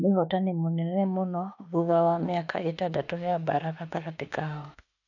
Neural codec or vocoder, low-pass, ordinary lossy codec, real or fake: autoencoder, 48 kHz, 32 numbers a frame, DAC-VAE, trained on Japanese speech; 7.2 kHz; none; fake